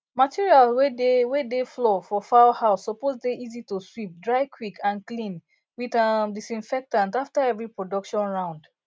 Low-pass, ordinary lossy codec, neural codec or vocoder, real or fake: none; none; none; real